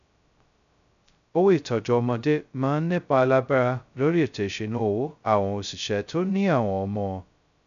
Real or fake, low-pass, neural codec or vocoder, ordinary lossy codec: fake; 7.2 kHz; codec, 16 kHz, 0.2 kbps, FocalCodec; none